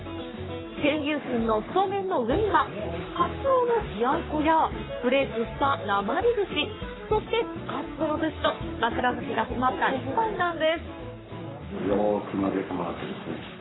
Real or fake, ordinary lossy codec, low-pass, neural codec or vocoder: fake; AAC, 16 kbps; 7.2 kHz; codec, 44.1 kHz, 3.4 kbps, Pupu-Codec